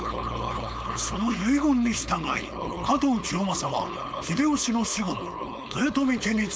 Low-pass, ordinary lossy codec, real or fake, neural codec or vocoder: none; none; fake; codec, 16 kHz, 4.8 kbps, FACodec